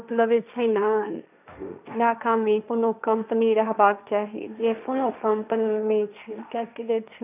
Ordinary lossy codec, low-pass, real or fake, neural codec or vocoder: none; 3.6 kHz; fake; codec, 16 kHz, 1.1 kbps, Voila-Tokenizer